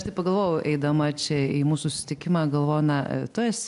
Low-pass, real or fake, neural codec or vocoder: 10.8 kHz; real; none